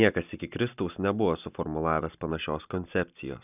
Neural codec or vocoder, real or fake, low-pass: none; real; 3.6 kHz